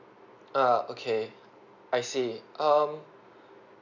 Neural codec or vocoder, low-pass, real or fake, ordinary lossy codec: none; 7.2 kHz; real; none